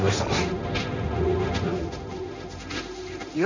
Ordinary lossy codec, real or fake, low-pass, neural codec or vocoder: none; fake; 7.2 kHz; codec, 16 kHz, 1.1 kbps, Voila-Tokenizer